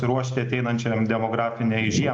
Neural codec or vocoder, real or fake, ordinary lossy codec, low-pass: none; real; AAC, 64 kbps; 9.9 kHz